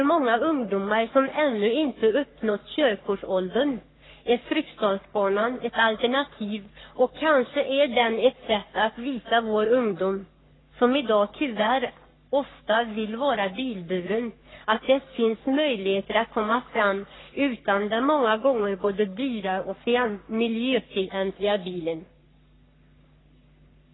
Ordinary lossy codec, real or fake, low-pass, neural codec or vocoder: AAC, 16 kbps; fake; 7.2 kHz; codec, 44.1 kHz, 3.4 kbps, Pupu-Codec